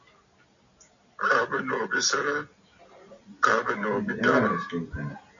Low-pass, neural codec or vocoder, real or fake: 7.2 kHz; none; real